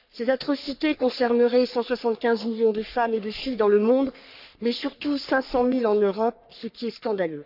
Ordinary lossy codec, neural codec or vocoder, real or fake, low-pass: none; codec, 44.1 kHz, 3.4 kbps, Pupu-Codec; fake; 5.4 kHz